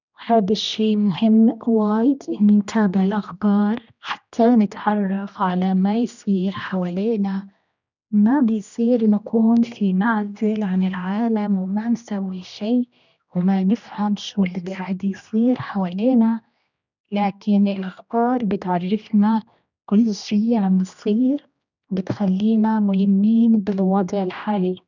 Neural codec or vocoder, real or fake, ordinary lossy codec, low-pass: codec, 16 kHz, 1 kbps, X-Codec, HuBERT features, trained on general audio; fake; none; 7.2 kHz